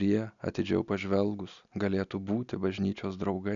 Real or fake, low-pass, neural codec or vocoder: real; 7.2 kHz; none